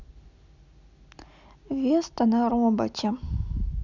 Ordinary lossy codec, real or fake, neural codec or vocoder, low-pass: none; real; none; 7.2 kHz